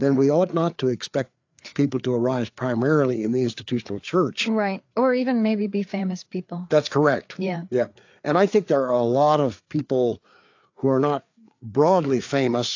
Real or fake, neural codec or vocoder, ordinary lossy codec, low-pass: fake; codec, 16 kHz, 4 kbps, FreqCodec, larger model; AAC, 48 kbps; 7.2 kHz